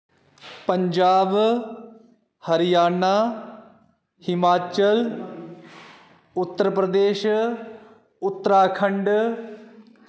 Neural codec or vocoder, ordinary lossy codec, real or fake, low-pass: none; none; real; none